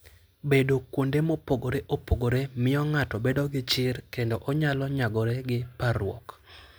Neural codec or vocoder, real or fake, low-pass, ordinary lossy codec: none; real; none; none